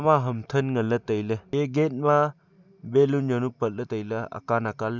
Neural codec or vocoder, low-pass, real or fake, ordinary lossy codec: none; 7.2 kHz; real; none